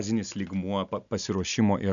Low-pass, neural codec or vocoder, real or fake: 7.2 kHz; none; real